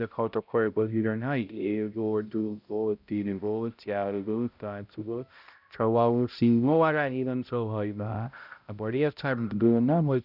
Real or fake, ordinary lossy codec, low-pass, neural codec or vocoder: fake; none; 5.4 kHz; codec, 16 kHz, 0.5 kbps, X-Codec, HuBERT features, trained on balanced general audio